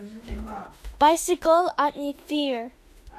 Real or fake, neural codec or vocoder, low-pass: fake; autoencoder, 48 kHz, 32 numbers a frame, DAC-VAE, trained on Japanese speech; 14.4 kHz